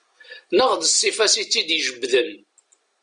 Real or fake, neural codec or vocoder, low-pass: real; none; 9.9 kHz